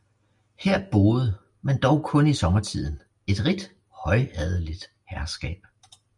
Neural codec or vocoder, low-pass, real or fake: none; 10.8 kHz; real